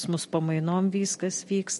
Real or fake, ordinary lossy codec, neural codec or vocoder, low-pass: real; MP3, 48 kbps; none; 14.4 kHz